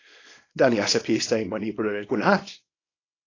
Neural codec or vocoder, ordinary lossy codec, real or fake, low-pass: codec, 24 kHz, 0.9 kbps, WavTokenizer, small release; AAC, 32 kbps; fake; 7.2 kHz